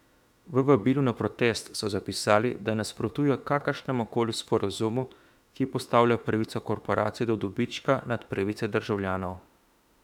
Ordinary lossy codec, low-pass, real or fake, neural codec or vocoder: none; 19.8 kHz; fake; autoencoder, 48 kHz, 32 numbers a frame, DAC-VAE, trained on Japanese speech